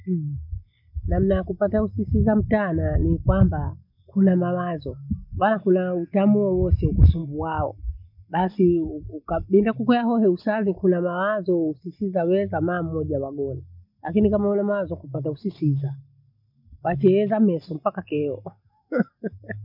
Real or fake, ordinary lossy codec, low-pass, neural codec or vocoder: real; none; 5.4 kHz; none